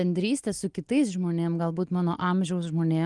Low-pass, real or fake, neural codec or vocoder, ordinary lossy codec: 10.8 kHz; real; none; Opus, 24 kbps